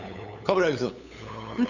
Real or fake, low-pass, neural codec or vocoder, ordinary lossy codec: fake; 7.2 kHz; codec, 16 kHz, 8 kbps, FunCodec, trained on LibriTTS, 25 frames a second; none